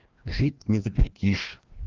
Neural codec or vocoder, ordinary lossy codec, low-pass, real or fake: codec, 16 kHz, 1 kbps, FreqCodec, larger model; Opus, 16 kbps; 7.2 kHz; fake